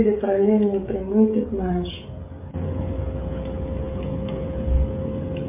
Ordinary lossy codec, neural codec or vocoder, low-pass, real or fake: MP3, 24 kbps; codec, 16 kHz, 16 kbps, FreqCodec, smaller model; 3.6 kHz; fake